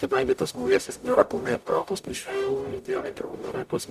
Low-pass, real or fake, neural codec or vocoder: 14.4 kHz; fake; codec, 44.1 kHz, 0.9 kbps, DAC